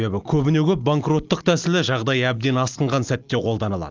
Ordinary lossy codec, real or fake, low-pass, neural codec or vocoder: Opus, 32 kbps; real; 7.2 kHz; none